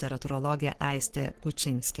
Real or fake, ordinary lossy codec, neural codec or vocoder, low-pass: fake; Opus, 16 kbps; codec, 44.1 kHz, 3.4 kbps, Pupu-Codec; 14.4 kHz